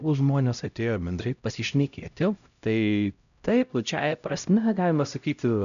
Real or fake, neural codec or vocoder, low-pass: fake; codec, 16 kHz, 0.5 kbps, X-Codec, HuBERT features, trained on LibriSpeech; 7.2 kHz